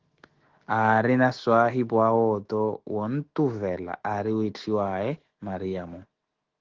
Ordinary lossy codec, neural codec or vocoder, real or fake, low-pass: Opus, 16 kbps; autoencoder, 48 kHz, 128 numbers a frame, DAC-VAE, trained on Japanese speech; fake; 7.2 kHz